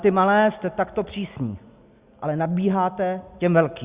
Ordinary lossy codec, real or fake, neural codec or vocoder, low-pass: Opus, 64 kbps; real; none; 3.6 kHz